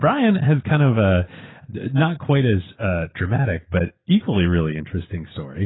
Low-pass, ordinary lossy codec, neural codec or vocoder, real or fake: 7.2 kHz; AAC, 16 kbps; none; real